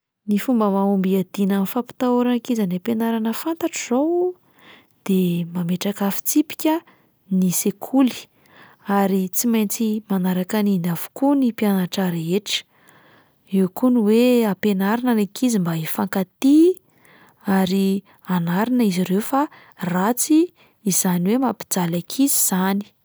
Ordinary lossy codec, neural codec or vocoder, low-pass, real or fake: none; none; none; real